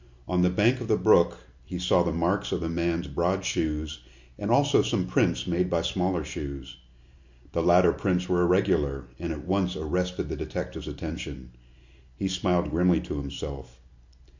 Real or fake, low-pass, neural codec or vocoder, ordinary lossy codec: real; 7.2 kHz; none; MP3, 48 kbps